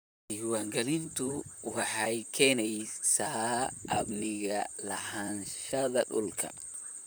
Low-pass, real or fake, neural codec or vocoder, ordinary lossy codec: none; fake; vocoder, 44.1 kHz, 128 mel bands, Pupu-Vocoder; none